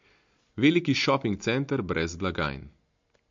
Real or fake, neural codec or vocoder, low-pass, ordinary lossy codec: real; none; 7.2 kHz; MP3, 48 kbps